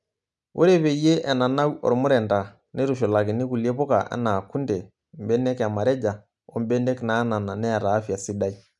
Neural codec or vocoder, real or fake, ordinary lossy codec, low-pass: none; real; none; 10.8 kHz